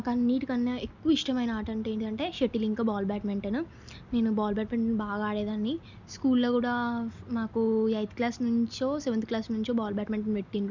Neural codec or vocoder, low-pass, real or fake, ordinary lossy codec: none; 7.2 kHz; real; none